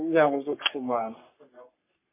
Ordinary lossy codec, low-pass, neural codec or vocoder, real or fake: MP3, 24 kbps; 3.6 kHz; codec, 44.1 kHz, 3.4 kbps, Pupu-Codec; fake